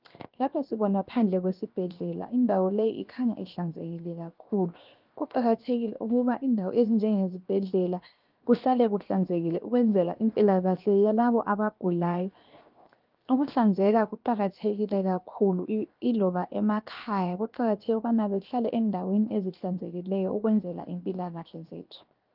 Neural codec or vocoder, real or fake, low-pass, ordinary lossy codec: codec, 16 kHz, 0.7 kbps, FocalCodec; fake; 5.4 kHz; Opus, 24 kbps